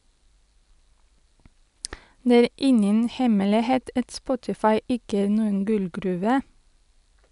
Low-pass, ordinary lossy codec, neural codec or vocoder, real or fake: 10.8 kHz; none; none; real